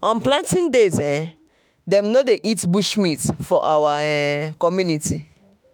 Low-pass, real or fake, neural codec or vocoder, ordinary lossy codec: none; fake; autoencoder, 48 kHz, 32 numbers a frame, DAC-VAE, trained on Japanese speech; none